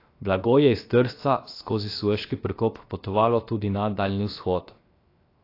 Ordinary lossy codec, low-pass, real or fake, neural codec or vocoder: AAC, 32 kbps; 5.4 kHz; fake; codec, 16 kHz, 0.7 kbps, FocalCodec